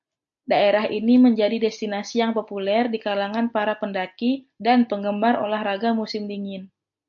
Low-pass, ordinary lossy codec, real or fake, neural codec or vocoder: 7.2 kHz; AAC, 64 kbps; real; none